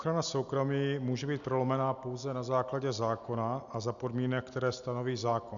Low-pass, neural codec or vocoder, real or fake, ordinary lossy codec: 7.2 kHz; none; real; MP3, 96 kbps